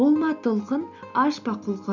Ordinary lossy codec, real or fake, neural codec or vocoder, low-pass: none; real; none; 7.2 kHz